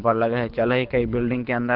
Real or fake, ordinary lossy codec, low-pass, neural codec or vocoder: fake; Opus, 16 kbps; 5.4 kHz; codec, 24 kHz, 6 kbps, HILCodec